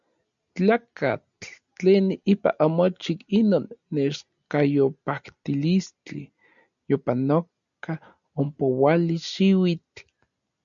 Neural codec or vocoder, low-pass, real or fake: none; 7.2 kHz; real